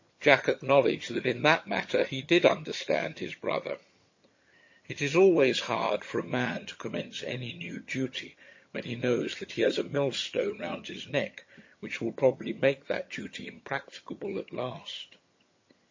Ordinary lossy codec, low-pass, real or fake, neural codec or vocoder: MP3, 32 kbps; 7.2 kHz; fake; vocoder, 22.05 kHz, 80 mel bands, HiFi-GAN